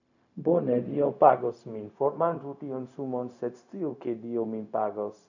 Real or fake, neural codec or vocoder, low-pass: fake; codec, 16 kHz, 0.4 kbps, LongCat-Audio-Codec; 7.2 kHz